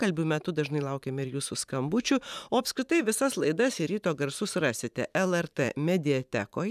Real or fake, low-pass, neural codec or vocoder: fake; 14.4 kHz; vocoder, 44.1 kHz, 128 mel bands every 512 samples, BigVGAN v2